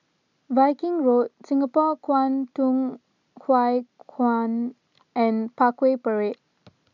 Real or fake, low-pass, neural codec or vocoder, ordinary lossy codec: real; 7.2 kHz; none; none